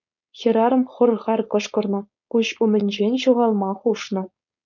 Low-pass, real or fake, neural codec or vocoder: 7.2 kHz; fake; codec, 16 kHz, 4.8 kbps, FACodec